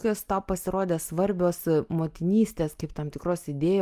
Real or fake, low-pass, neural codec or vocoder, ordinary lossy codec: real; 14.4 kHz; none; Opus, 32 kbps